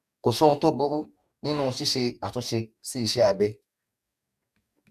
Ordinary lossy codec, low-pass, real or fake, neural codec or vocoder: MP3, 96 kbps; 14.4 kHz; fake; codec, 44.1 kHz, 2.6 kbps, DAC